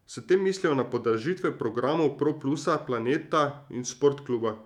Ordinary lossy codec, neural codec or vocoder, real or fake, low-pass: none; autoencoder, 48 kHz, 128 numbers a frame, DAC-VAE, trained on Japanese speech; fake; 19.8 kHz